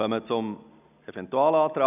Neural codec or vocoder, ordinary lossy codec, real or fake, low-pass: none; none; real; 3.6 kHz